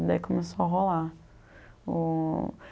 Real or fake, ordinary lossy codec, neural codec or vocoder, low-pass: real; none; none; none